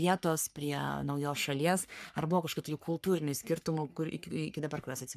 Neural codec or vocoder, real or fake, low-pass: codec, 44.1 kHz, 3.4 kbps, Pupu-Codec; fake; 14.4 kHz